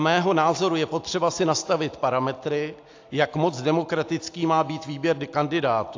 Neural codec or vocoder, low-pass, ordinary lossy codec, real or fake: none; 7.2 kHz; AAC, 48 kbps; real